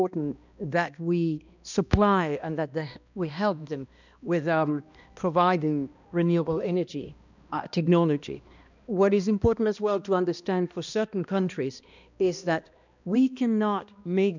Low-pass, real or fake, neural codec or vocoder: 7.2 kHz; fake; codec, 16 kHz, 1 kbps, X-Codec, HuBERT features, trained on balanced general audio